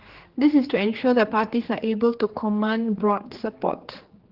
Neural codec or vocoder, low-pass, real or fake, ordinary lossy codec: codec, 16 kHz, 4 kbps, X-Codec, HuBERT features, trained on general audio; 5.4 kHz; fake; Opus, 16 kbps